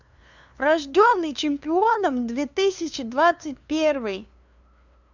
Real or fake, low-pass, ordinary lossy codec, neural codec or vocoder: fake; 7.2 kHz; none; codec, 16 kHz, 2 kbps, FunCodec, trained on LibriTTS, 25 frames a second